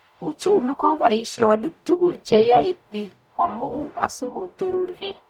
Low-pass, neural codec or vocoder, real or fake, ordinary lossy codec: 19.8 kHz; codec, 44.1 kHz, 0.9 kbps, DAC; fake; none